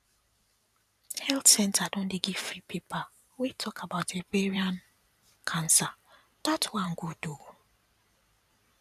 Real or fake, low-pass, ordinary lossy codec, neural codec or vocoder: fake; 14.4 kHz; none; vocoder, 44.1 kHz, 128 mel bands every 512 samples, BigVGAN v2